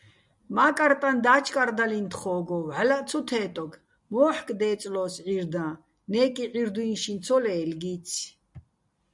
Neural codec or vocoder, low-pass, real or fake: none; 10.8 kHz; real